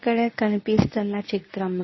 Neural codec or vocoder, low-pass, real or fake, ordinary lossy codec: codec, 24 kHz, 0.9 kbps, WavTokenizer, medium speech release version 1; 7.2 kHz; fake; MP3, 24 kbps